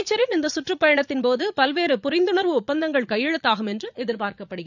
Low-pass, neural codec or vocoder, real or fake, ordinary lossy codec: 7.2 kHz; vocoder, 44.1 kHz, 80 mel bands, Vocos; fake; none